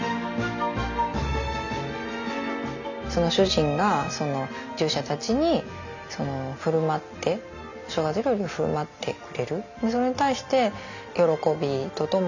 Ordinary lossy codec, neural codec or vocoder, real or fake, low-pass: none; none; real; 7.2 kHz